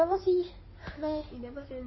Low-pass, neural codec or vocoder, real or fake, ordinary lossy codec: 7.2 kHz; codec, 16 kHz in and 24 kHz out, 2.2 kbps, FireRedTTS-2 codec; fake; MP3, 24 kbps